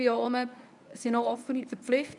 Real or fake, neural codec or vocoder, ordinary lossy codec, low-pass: fake; codec, 24 kHz, 0.9 kbps, WavTokenizer, medium speech release version 1; none; 10.8 kHz